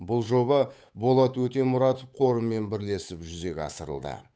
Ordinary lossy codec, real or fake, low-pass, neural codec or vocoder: none; fake; none; codec, 16 kHz, 8 kbps, FunCodec, trained on Chinese and English, 25 frames a second